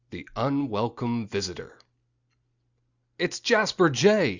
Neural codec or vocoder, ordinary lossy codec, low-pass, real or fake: none; Opus, 64 kbps; 7.2 kHz; real